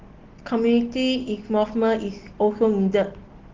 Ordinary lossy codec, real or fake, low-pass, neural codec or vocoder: Opus, 16 kbps; real; 7.2 kHz; none